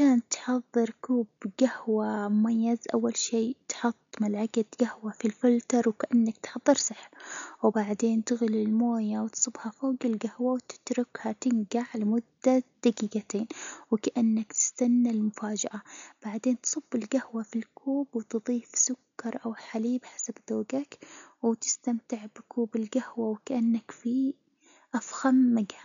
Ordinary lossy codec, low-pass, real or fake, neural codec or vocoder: none; 7.2 kHz; real; none